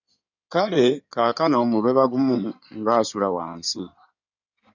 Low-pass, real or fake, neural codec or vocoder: 7.2 kHz; fake; codec, 16 kHz in and 24 kHz out, 2.2 kbps, FireRedTTS-2 codec